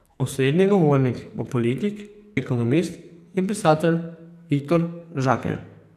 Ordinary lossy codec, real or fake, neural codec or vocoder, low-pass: none; fake; codec, 44.1 kHz, 2.6 kbps, SNAC; 14.4 kHz